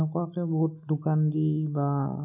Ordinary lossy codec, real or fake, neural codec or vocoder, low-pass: none; real; none; 3.6 kHz